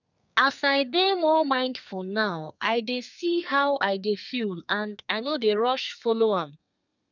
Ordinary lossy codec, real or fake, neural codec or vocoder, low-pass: none; fake; codec, 32 kHz, 1.9 kbps, SNAC; 7.2 kHz